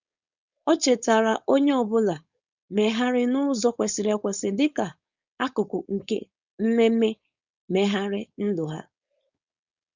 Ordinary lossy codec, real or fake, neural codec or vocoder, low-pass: Opus, 64 kbps; fake; codec, 16 kHz, 4.8 kbps, FACodec; 7.2 kHz